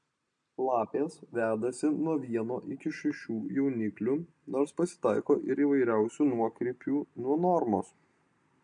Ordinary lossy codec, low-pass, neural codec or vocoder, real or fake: MP3, 64 kbps; 9.9 kHz; none; real